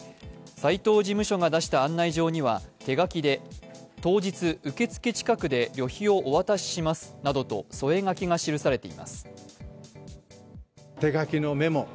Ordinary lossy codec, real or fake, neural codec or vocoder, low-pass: none; real; none; none